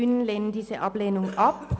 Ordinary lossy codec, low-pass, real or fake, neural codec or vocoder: none; none; real; none